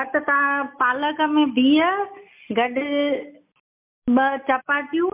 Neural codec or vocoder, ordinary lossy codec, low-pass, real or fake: none; MP3, 32 kbps; 3.6 kHz; real